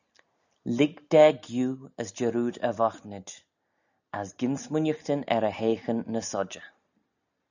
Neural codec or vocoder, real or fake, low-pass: none; real; 7.2 kHz